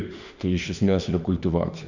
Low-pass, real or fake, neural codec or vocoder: 7.2 kHz; fake; autoencoder, 48 kHz, 32 numbers a frame, DAC-VAE, trained on Japanese speech